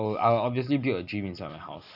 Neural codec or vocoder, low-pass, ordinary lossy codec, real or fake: none; 5.4 kHz; none; real